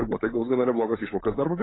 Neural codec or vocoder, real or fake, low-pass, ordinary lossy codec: codec, 16 kHz, 16 kbps, FunCodec, trained on LibriTTS, 50 frames a second; fake; 7.2 kHz; AAC, 16 kbps